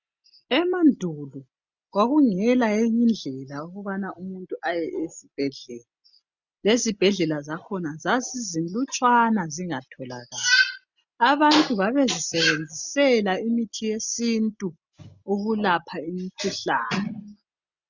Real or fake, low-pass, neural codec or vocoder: real; 7.2 kHz; none